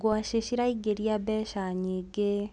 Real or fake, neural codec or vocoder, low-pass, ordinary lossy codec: real; none; 10.8 kHz; none